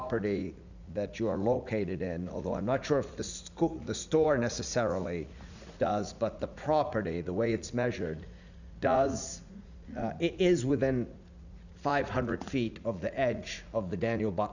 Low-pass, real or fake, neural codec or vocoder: 7.2 kHz; fake; vocoder, 44.1 kHz, 80 mel bands, Vocos